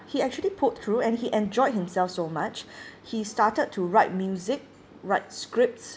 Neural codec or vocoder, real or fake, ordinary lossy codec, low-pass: none; real; none; none